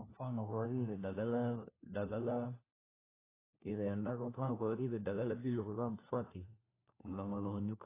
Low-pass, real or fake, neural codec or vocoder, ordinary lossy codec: 3.6 kHz; fake; codec, 16 kHz, 1 kbps, FunCodec, trained on LibriTTS, 50 frames a second; AAC, 16 kbps